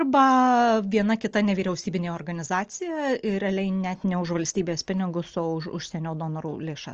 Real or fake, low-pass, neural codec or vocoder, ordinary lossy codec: real; 7.2 kHz; none; Opus, 24 kbps